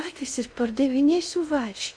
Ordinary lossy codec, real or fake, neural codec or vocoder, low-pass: Opus, 64 kbps; fake; codec, 16 kHz in and 24 kHz out, 0.6 kbps, FocalCodec, streaming, 2048 codes; 9.9 kHz